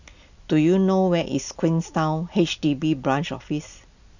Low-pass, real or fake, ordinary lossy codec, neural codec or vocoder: 7.2 kHz; real; none; none